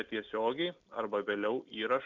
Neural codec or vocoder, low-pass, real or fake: none; 7.2 kHz; real